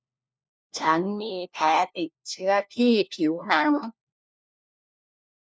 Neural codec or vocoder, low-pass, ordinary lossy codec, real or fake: codec, 16 kHz, 1 kbps, FunCodec, trained on LibriTTS, 50 frames a second; none; none; fake